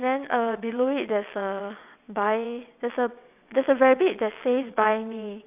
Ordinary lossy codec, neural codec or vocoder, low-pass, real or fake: none; vocoder, 22.05 kHz, 80 mel bands, WaveNeXt; 3.6 kHz; fake